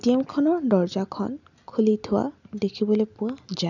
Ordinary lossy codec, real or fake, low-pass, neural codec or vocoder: none; real; 7.2 kHz; none